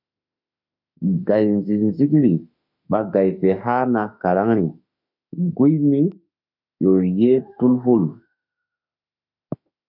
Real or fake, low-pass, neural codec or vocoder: fake; 5.4 kHz; autoencoder, 48 kHz, 32 numbers a frame, DAC-VAE, trained on Japanese speech